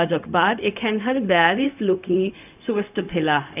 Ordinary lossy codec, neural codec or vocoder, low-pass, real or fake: none; codec, 16 kHz, 0.4 kbps, LongCat-Audio-Codec; 3.6 kHz; fake